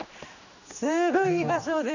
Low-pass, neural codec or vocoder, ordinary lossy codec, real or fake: 7.2 kHz; codec, 16 kHz, 2 kbps, X-Codec, HuBERT features, trained on general audio; none; fake